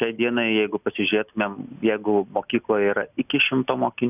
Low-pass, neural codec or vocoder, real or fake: 3.6 kHz; none; real